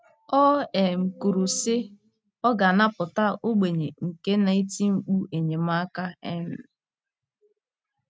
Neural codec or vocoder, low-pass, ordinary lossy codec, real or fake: none; none; none; real